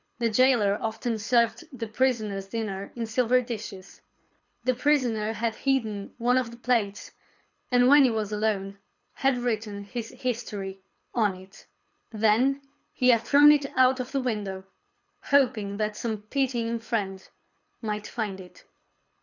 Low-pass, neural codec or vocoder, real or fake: 7.2 kHz; codec, 24 kHz, 6 kbps, HILCodec; fake